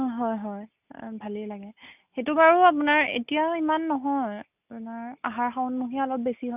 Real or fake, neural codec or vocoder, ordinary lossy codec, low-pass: real; none; none; 3.6 kHz